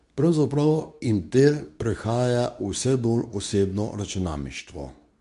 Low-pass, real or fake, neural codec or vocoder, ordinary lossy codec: 10.8 kHz; fake; codec, 24 kHz, 0.9 kbps, WavTokenizer, medium speech release version 2; none